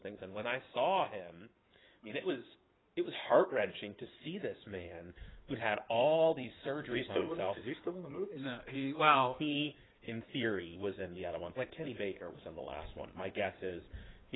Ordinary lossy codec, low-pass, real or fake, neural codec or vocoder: AAC, 16 kbps; 7.2 kHz; fake; codec, 16 kHz in and 24 kHz out, 2.2 kbps, FireRedTTS-2 codec